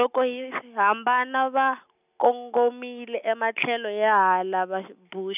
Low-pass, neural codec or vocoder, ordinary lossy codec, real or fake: 3.6 kHz; none; none; real